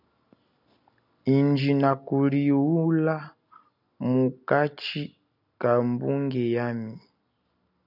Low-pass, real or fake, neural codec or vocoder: 5.4 kHz; real; none